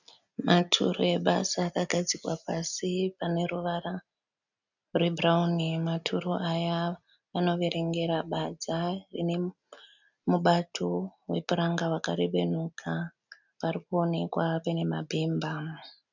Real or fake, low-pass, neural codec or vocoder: real; 7.2 kHz; none